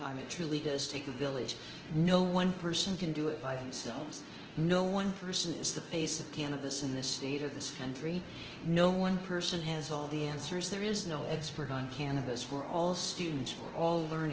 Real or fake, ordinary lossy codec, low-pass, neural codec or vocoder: fake; Opus, 16 kbps; 7.2 kHz; codec, 24 kHz, 0.9 kbps, DualCodec